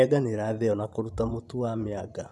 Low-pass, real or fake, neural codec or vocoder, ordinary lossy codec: none; fake; vocoder, 24 kHz, 100 mel bands, Vocos; none